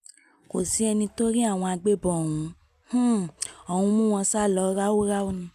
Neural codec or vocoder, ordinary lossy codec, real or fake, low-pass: none; none; real; 14.4 kHz